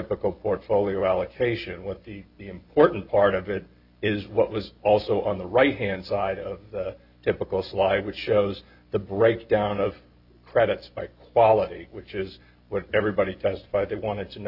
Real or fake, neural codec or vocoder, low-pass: real; none; 5.4 kHz